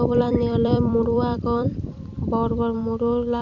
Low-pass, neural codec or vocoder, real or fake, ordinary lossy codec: 7.2 kHz; vocoder, 44.1 kHz, 128 mel bands every 256 samples, BigVGAN v2; fake; none